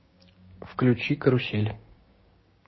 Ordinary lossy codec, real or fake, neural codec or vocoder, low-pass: MP3, 24 kbps; fake; codec, 16 kHz, 6 kbps, DAC; 7.2 kHz